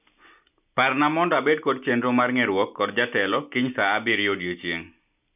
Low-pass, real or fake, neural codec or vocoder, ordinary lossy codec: 3.6 kHz; real; none; none